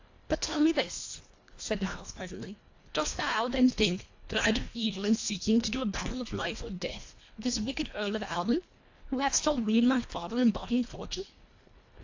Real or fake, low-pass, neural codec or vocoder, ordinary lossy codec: fake; 7.2 kHz; codec, 24 kHz, 1.5 kbps, HILCodec; AAC, 48 kbps